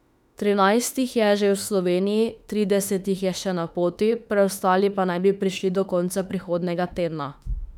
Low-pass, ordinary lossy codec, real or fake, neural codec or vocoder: 19.8 kHz; none; fake; autoencoder, 48 kHz, 32 numbers a frame, DAC-VAE, trained on Japanese speech